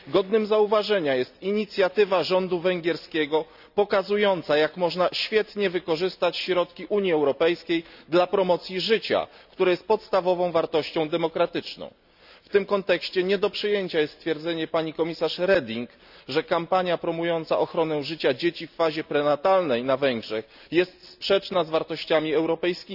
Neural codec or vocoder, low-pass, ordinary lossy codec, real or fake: none; 5.4 kHz; none; real